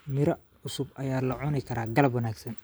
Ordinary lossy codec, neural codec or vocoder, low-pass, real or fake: none; vocoder, 44.1 kHz, 128 mel bands every 512 samples, BigVGAN v2; none; fake